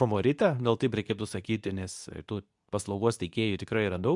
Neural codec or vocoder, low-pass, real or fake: codec, 24 kHz, 0.9 kbps, WavTokenizer, medium speech release version 2; 10.8 kHz; fake